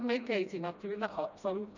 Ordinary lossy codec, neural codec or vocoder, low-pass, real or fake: none; codec, 16 kHz, 1 kbps, FreqCodec, smaller model; 7.2 kHz; fake